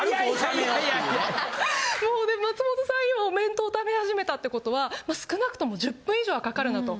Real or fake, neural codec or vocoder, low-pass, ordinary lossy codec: real; none; none; none